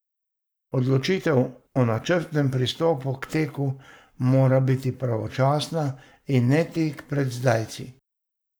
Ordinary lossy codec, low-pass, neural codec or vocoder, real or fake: none; none; codec, 44.1 kHz, 7.8 kbps, Pupu-Codec; fake